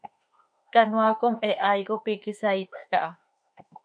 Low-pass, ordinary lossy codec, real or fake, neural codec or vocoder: 9.9 kHz; AAC, 64 kbps; fake; autoencoder, 48 kHz, 32 numbers a frame, DAC-VAE, trained on Japanese speech